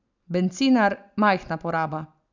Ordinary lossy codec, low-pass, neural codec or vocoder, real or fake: none; 7.2 kHz; none; real